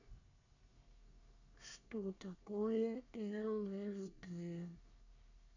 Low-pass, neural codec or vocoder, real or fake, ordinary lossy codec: 7.2 kHz; codec, 24 kHz, 1 kbps, SNAC; fake; none